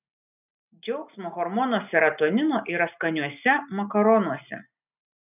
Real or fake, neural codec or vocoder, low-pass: real; none; 3.6 kHz